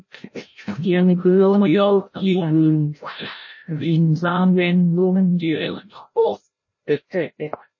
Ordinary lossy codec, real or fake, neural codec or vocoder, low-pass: MP3, 32 kbps; fake; codec, 16 kHz, 0.5 kbps, FreqCodec, larger model; 7.2 kHz